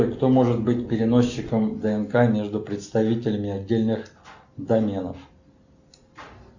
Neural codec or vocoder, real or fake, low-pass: none; real; 7.2 kHz